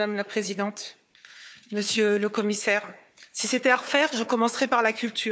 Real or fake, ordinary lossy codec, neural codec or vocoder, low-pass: fake; none; codec, 16 kHz, 4 kbps, FunCodec, trained on Chinese and English, 50 frames a second; none